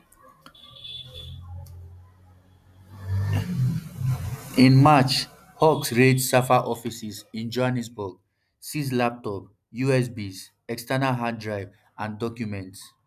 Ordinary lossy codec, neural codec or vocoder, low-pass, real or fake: none; none; 14.4 kHz; real